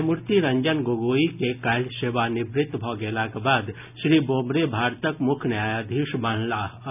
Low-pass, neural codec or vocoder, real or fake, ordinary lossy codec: 3.6 kHz; none; real; none